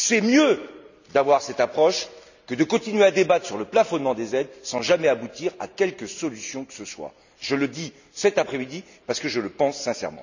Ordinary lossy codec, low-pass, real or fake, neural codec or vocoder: none; 7.2 kHz; real; none